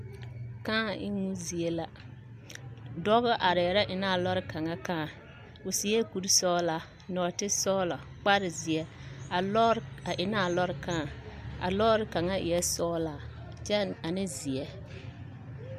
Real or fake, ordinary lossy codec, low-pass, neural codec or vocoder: real; MP3, 96 kbps; 14.4 kHz; none